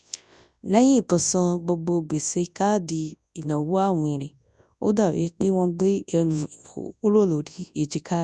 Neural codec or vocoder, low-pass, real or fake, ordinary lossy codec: codec, 24 kHz, 0.9 kbps, WavTokenizer, large speech release; 10.8 kHz; fake; none